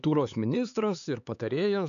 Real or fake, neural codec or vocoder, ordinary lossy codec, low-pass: fake; codec, 16 kHz, 4 kbps, X-Codec, HuBERT features, trained on LibriSpeech; MP3, 96 kbps; 7.2 kHz